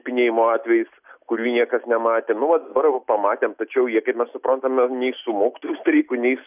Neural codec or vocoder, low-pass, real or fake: none; 3.6 kHz; real